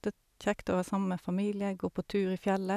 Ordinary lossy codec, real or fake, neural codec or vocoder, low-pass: none; real; none; 14.4 kHz